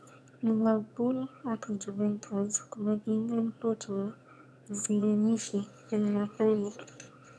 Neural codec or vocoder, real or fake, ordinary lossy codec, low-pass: autoencoder, 22.05 kHz, a latent of 192 numbers a frame, VITS, trained on one speaker; fake; none; none